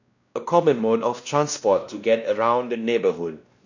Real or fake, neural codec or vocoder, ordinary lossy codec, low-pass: fake; codec, 16 kHz, 1 kbps, X-Codec, WavLM features, trained on Multilingual LibriSpeech; none; 7.2 kHz